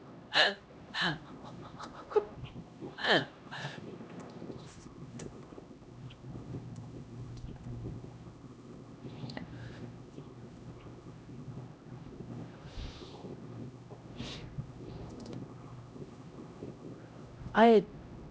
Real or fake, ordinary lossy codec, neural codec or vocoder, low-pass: fake; none; codec, 16 kHz, 1 kbps, X-Codec, HuBERT features, trained on LibriSpeech; none